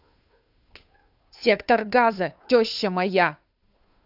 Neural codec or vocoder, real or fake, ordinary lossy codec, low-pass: codec, 16 kHz, 2 kbps, FunCodec, trained on Chinese and English, 25 frames a second; fake; none; 5.4 kHz